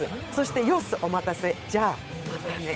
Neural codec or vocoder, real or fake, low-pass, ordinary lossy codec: codec, 16 kHz, 8 kbps, FunCodec, trained on Chinese and English, 25 frames a second; fake; none; none